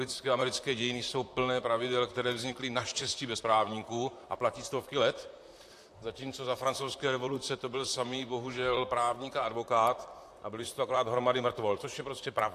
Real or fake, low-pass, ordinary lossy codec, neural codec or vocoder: fake; 14.4 kHz; AAC, 64 kbps; vocoder, 44.1 kHz, 128 mel bands, Pupu-Vocoder